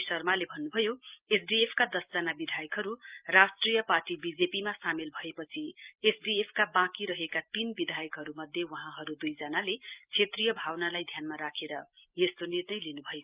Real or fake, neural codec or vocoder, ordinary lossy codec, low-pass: real; none; Opus, 32 kbps; 3.6 kHz